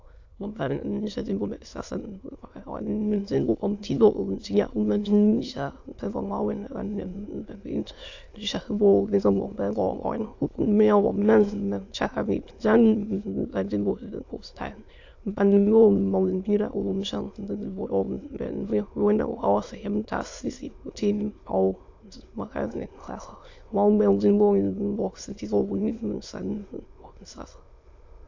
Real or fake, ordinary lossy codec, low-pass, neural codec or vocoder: fake; AAC, 48 kbps; 7.2 kHz; autoencoder, 22.05 kHz, a latent of 192 numbers a frame, VITS, trained on many speakers